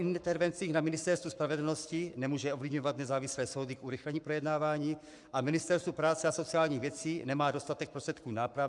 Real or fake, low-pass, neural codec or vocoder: fake; 10.8 kHz; codec, 44.1 kHz, 7.8 kbps, Pupu-Codec